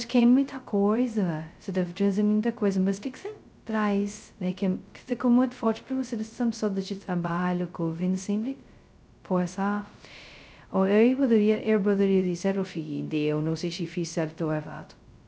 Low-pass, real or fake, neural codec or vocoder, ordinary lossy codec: none; fake; codec, 16 kHz, 0.2 kbps, FocalCodec; none